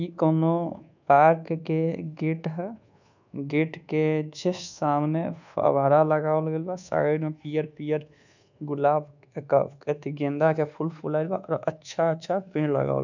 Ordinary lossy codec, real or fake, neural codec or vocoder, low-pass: none; fake; codec, 24 kHz, 1.2 kbps, DualCodec; 7.2 kHz